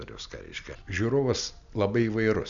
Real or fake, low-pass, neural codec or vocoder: real; 7.2 kHz; none